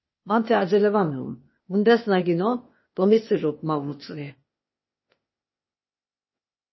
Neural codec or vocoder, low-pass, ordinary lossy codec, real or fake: codec, 16 kHz, 0.8 kbps, ZipCodec; 7.2 kHz; MP3, 24 kbps; fake